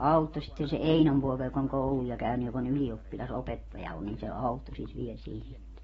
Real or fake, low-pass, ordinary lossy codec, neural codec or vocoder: real; 19.8 kHz; AAC, 24 kbps; none